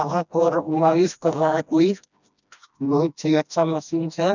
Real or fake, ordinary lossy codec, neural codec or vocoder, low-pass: fake; none; codec, 16 kHz, 1 kbps, FreqCodec, smaller model; 7.2 kHz